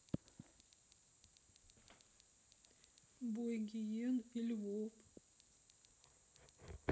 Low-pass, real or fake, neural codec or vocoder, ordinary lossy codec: none; real; none; none